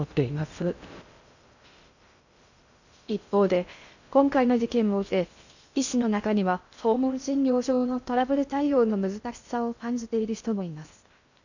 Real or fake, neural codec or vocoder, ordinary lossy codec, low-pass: fake; codec, 16 kHz in and 24 kHz out, 0.6 kbps, FocalCodec, streaming, 2048 codes; none; 7.2 kHz